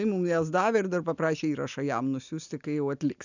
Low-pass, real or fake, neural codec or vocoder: 7.2 kHz; real; none